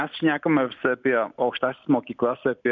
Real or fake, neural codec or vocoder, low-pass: real; none; 7.2 kHz